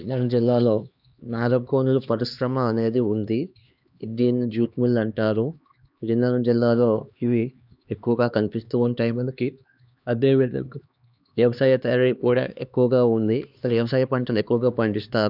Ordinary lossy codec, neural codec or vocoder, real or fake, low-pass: none; codec, 16 kHz, 2 kbps, X-Codec, HuBERT features, trained on LibriSpeech; fake; 5.4 kHz